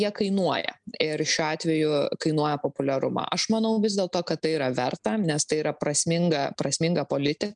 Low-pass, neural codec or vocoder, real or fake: 9.9 kHz; none; real